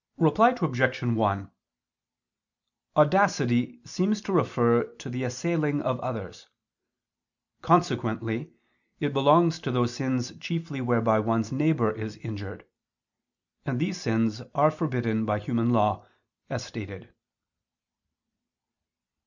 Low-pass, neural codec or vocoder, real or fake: 7.2 kHz; none; real